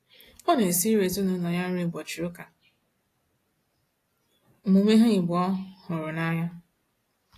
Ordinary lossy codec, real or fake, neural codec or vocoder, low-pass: AAC, 48 kbps; real; none; 14.4 kHz